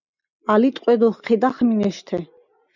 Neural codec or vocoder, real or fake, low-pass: none; real; 7.2 kHz